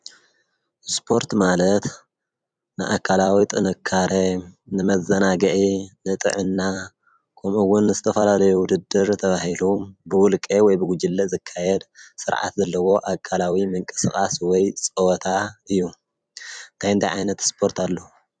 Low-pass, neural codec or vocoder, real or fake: 9.9 kHz; vocoder, 44.1 kHz, 128 mel bands every 256 samples, BigVGAN v2; fake